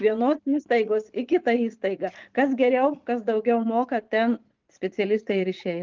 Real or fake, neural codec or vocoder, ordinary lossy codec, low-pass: fake; vocoder, 44.1 kHz, 80 mel bands, Vocos; Opus, 24 kbps; 7.2 kHz